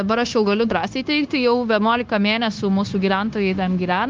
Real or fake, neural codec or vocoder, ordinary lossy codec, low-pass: fake; codec, 16 kHz, 0.9 kbps, LongCat-Audio-Codec; Opus, 32 kbps; 7.2 kHz